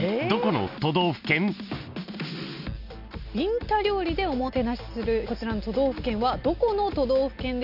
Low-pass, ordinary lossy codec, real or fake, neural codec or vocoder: 5.4 kHz; none; real; none